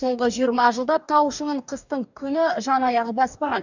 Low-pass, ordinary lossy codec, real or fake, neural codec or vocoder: 7.2 kHz; none; fake; codec, 44.1 kHz, 2.6 kbps, DAC